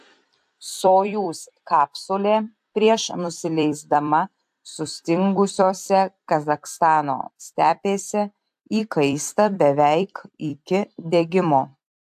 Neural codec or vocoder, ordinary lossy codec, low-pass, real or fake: vocoder, 44.1 kHz, 128 mel bands every 256 samples, BigVGAN v2; AAC, 96 kbps; 14.4 kHz; fake